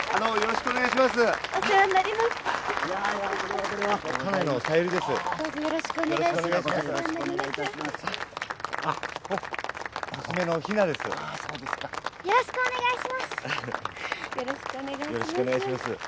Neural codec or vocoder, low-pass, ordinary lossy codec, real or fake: none; none; none; real